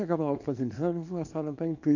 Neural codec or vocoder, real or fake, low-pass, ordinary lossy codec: codec, 24 kHz, 0.9 kbps, WavTokenizer, small release; fake; 7.2 kHz; none